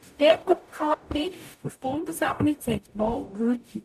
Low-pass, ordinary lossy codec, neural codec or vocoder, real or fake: 14.4 kHz; none; codec, 44.1 kHz, 0.9 kbps, DAC; fake